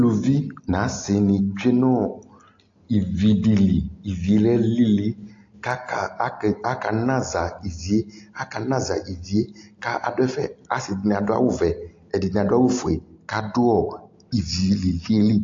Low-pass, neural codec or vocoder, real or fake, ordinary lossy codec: 7.2 kHz; none; real; AAC, 48 kbps